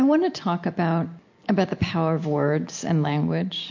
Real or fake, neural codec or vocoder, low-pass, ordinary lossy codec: real; none; 7.2 kHz; MP3, 48 kbps